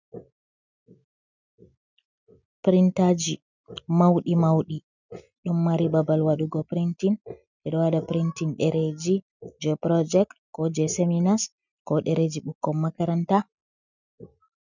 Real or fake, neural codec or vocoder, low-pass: real; none; 7.2 kHz